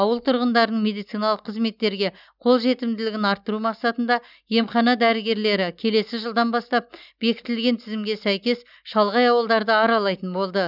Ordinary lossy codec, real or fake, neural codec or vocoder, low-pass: none; real; none; 5.4 kHz